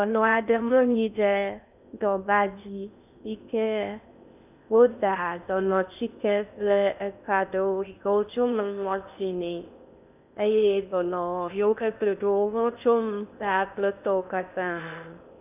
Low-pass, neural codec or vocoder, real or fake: 3.6 kHz; codec, 16 kHz in and 24 kHz out, 0.6 kbps, FocalCodec, streaming, 2048 codes; fake